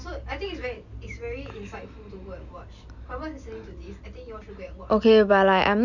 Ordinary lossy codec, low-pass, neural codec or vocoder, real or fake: none; 7.2 kHz; none; real